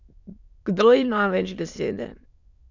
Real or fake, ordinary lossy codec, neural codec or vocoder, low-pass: fake; none; autoencoder, 22.05 kHz, a latent of 192 numbers a frame, VITS, trained on many speakers; 7.2 kHz